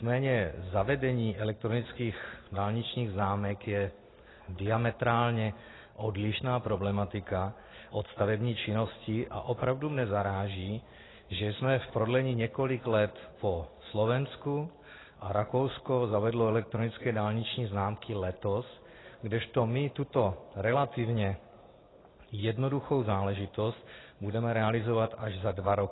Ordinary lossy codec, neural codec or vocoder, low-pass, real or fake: AAC, 16 kbps; none; 7.2 kHz; real